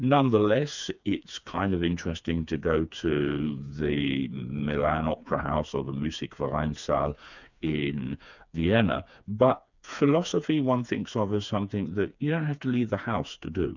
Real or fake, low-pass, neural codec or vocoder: fake; 7.2 kHz; codec, 16 kHz, 4 kbps, FreqCodec, smaller model